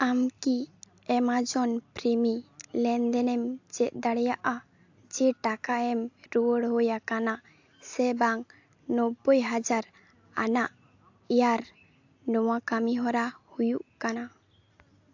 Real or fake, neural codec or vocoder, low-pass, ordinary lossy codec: real; none; 7.2 kHz; AAC, 48 kbps